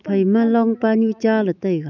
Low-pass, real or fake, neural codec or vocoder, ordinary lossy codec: 7.2 kHz; fake; autoencoder, 48 kHz, 128 numbers a frame, DAC-VAE, trained on Japanese speech; none